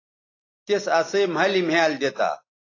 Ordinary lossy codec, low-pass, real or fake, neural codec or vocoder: AAC, 32 kbps; 7.2 kHz; real; none